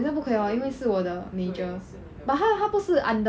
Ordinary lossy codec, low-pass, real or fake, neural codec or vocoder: none; none; real; none